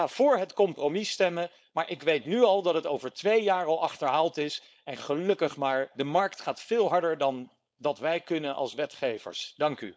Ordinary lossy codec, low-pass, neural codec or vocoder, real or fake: none; none; codec, 16 kHz, 4.8 kbps, FACodec; fake